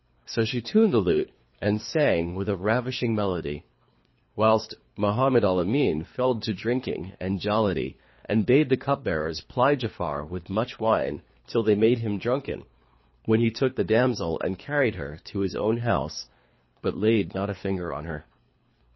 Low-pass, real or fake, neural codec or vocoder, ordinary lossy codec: 7.2 kHz; fake; codec, 24 kHz, 3 kbps, HILCodec; MP3, 24 kbps